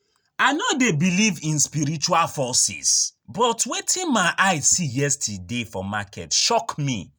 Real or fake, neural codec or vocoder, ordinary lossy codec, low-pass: real; none; none; none